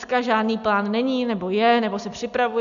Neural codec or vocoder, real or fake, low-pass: none; real; 7.2 kHz